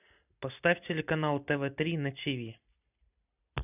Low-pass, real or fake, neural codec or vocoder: 3.6 kHz; real; none